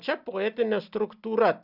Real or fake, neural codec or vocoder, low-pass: real; none; 5.4 kHz